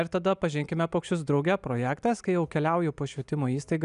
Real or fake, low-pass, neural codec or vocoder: real; 10.8 kHz; none